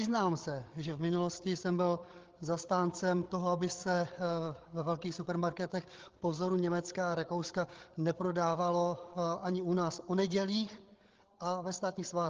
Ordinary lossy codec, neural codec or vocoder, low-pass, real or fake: Opus, 16 kbps; codec, 16 kHz, 8 kbps, FreqCodec, larger model; 7.2 kHz; fake